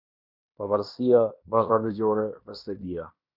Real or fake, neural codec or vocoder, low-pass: fake; codec, 16 kHz in and 24 kHz out, 0.9 kbps, LongCat-Audio-Codec, fine tuned four codebook decoder; 5.4 kHz